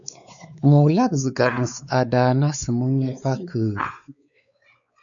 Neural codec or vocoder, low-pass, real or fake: codec, 16 kHz, 4 kbps, X-Codec, WavLM features, trained on Multilingual LibriSpeech; 7.2 kHz; fake